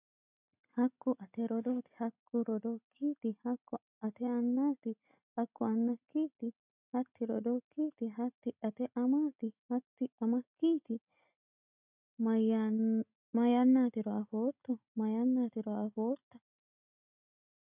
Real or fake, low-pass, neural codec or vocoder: real; 3.6 kHz; none